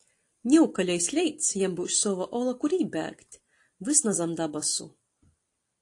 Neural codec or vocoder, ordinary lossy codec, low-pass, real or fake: none; AAC, 48 kbps; 10.8 kHz; real